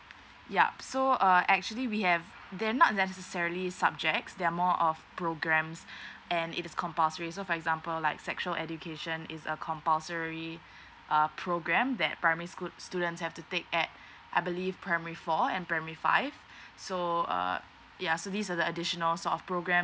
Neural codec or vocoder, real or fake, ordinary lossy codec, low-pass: none; real; none; none